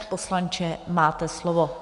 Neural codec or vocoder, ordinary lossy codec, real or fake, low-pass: none; Opus, 64 kbps; real; 10.8 kHz